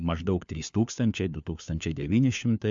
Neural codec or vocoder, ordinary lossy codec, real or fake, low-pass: codec, 16 kHz, 4 kbps, FunCodec, trained on LibriTTS, 50 frames a second; MP3, 64 kbps; fake; 7.2 kHz